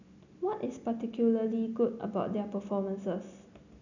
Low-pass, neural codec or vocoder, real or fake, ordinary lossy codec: 7.2 kHz; none; real; none